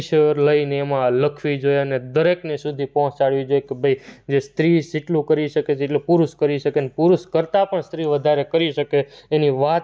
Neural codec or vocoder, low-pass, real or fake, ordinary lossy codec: none; none; real; none